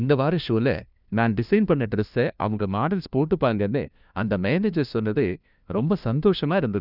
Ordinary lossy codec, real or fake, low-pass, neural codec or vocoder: none; fake; 5.4 kHz; codec, 16 kHz, 1 kbps, FunCodec, trained on LibriTTS, 50 frames a second